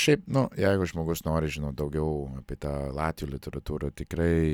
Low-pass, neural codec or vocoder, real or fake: 19.8 kHz; none; real